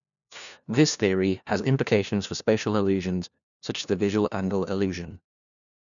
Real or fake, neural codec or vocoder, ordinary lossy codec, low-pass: fake; codec, 16 kHz, 1 kbps, FunCodec, trained on LibriTTS, 50 frames a second; none; 7.2 kHz